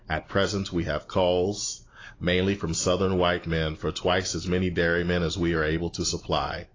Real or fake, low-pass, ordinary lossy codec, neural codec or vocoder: real; 7.2 kHz; AAC, 32 kbps; none